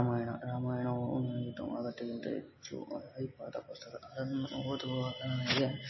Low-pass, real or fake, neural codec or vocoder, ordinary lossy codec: 7.2 kHz; real; none; MP3, 24 kbps